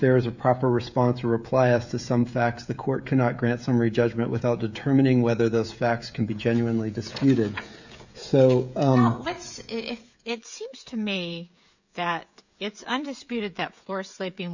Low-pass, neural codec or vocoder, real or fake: 7.2 kHz; codec, 16 kHz, 16 kbps, FreqCodec, smaller model; fake